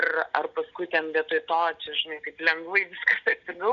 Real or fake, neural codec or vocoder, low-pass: real; none; 7.2 kHz